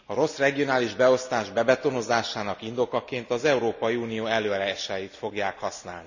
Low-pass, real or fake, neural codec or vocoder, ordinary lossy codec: 7.2 kHz; real; none; none